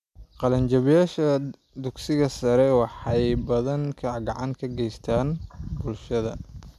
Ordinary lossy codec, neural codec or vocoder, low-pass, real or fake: MP3, 96 kbps; none; 14.4 kHz; real